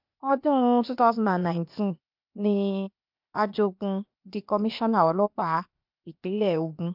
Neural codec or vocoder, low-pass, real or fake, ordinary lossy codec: codec, 16 kHz, 0.8 kbps, ZipCodec; 5.4 kHz; fake; MP3, 48 kbps